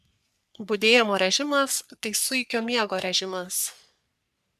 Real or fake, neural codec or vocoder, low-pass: fake; codec, 44.1 kHz, 3.4 kbps, Pupu-Codec; 14.4 kHz